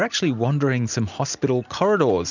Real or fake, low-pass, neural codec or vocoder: real; 7.2 kHz; none